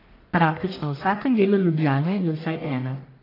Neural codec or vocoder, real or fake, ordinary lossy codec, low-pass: codec, 44.1 kHz, 1.7 kbps, Pupu-Codec; fake; AAC, 24 kbps; 5.4 kHz